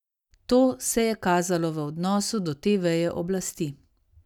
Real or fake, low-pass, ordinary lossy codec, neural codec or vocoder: real; 19.8 kHz; none; none